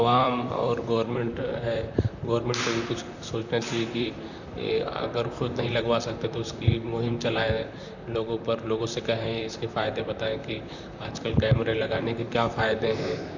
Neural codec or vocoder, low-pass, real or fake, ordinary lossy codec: vocoder, 44.1 kHz, 128 mel bands, Pupu-Vocoder; 7.2 kHz; fake; none